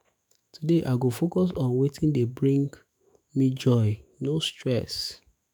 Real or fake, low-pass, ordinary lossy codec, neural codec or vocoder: fake; none; none; autoencoder, 48 kHz, 128 numbers a frame, DAC-VAE, trained on Japanese speech